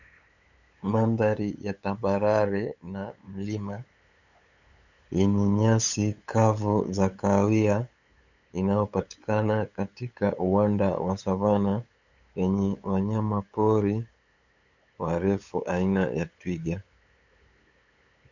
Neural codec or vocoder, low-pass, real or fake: codec, 16 kHz, 8 kbps, FunCodec, trained on LibriTTS, 25 frames a second; 7.2 kHz; fake